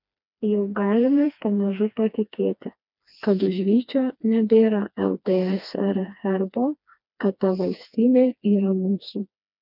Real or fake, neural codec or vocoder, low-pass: fake; codec, 16 kHz, 2 kbps, FreqCodec, smaller model; 5.4 kHz